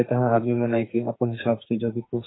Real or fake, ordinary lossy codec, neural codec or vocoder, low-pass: fake; AAC, 16 kbps; codec, 44.1 kHz, 2.6 kbps, SNAC; 7.2 kHz